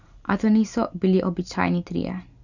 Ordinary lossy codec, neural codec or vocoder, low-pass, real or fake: none; none; 7.2 kHz; real